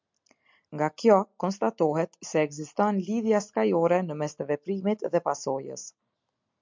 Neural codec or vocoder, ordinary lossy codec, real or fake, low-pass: none; MP3, 64 kbps; real; 7.2 kHz